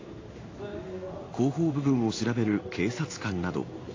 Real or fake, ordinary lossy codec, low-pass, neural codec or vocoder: fake; AAC, 32 kbps; 7.2 kHz; codec, 16 kHz in and 24 kHz out, 1 kbps, XY-Tokenizer